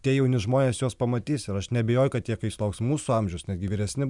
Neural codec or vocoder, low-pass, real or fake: none; 10.8 kHz; real